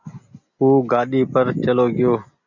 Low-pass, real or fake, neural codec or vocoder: 7.2 kHz; real; none